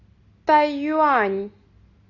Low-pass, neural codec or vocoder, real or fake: 7.2 kHz; none; real